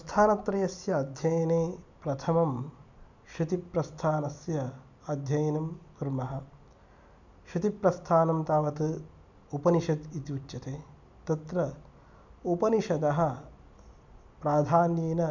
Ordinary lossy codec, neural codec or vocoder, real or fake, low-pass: none; none; real; 7.2 kHz